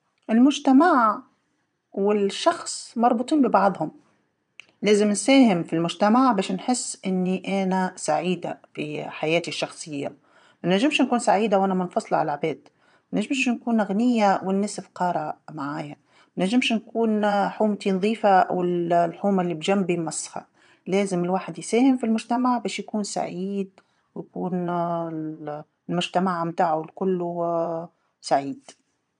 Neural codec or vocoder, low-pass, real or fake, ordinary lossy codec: vocoder, 22.05 kHz, 80 mel bands, Vocos; 9.9 kHz; fake; none